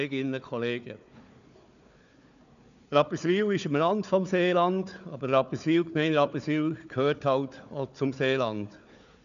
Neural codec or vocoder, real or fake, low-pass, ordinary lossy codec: codec, 16 kHz, 4 kbps, FunCodec, trained on Chinese and English, 50 frames a second; fake; 7.2 kHz; none